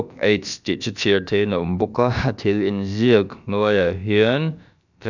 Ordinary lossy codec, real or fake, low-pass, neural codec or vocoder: none; fake; 7.2 kHz; codec, 16 kHz, about 1 kbps, DyCAST, with the encoder's durations